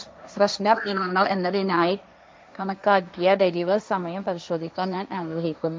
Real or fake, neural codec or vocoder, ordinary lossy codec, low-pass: fake; codec, 16 kHz, 1.1 kbps, Voila-Tokenizer; none; none